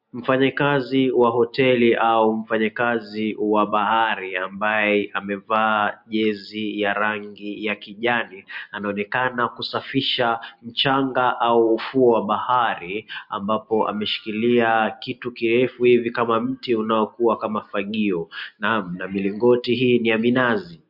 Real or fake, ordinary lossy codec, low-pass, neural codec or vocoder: real; MP3, 48 kbps; 5.4 kHz; none